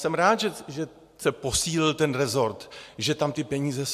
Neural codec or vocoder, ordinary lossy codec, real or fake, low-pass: none; AAC, 96 kbps; real; 14.4 kHz